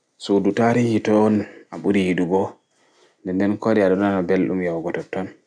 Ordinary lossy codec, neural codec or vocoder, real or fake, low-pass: none; none; real; 9.9 kHz